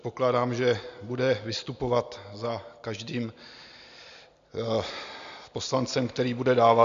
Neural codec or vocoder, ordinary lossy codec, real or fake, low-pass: none; MP3, 64 kbps; real; 7.2 kHz